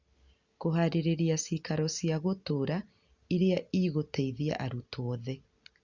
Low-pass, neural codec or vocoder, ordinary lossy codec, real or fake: 7.2 kHz; none; Opus, 64 kbps; real